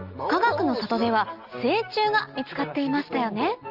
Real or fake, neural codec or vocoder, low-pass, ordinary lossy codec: real; none; 5.4 kHz; Opus, 24 kbps